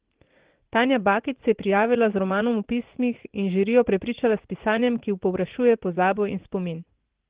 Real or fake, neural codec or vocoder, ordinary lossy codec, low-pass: fake; vocoder, 44.1 kHz, 80 mel bands, Vocos; Opus, 16 kbps; 3.6 kHz